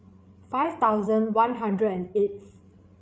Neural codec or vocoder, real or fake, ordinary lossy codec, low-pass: codec, 16 kHz, 8 kbps, FreqCodec, larger model; fake; none; none